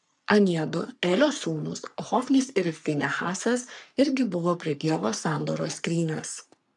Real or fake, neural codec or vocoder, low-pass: fake; codec, 44.1 kHz, 3.4 kbps, Pupu-Codec; 10.8 kHz